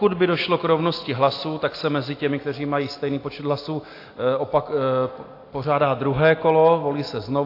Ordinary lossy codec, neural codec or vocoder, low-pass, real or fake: AAC, 32 kbps; none; 5.4 kHz; real